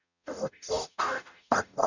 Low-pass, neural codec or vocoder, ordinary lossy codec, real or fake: 7.2 kHz; codec, 44.1 kHz, 0.9 kbps, DAC; none; fake